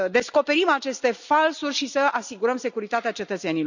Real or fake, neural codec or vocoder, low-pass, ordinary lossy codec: real; none; 7.2 kHz; none